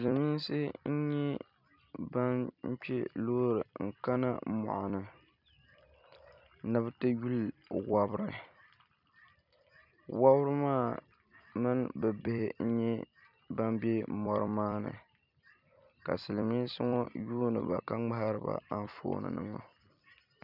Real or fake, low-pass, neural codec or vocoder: fake; 5.4 kHz; vocoder, 44.1 kHz, 128 mel bands every 256 samples, BigVGAN v2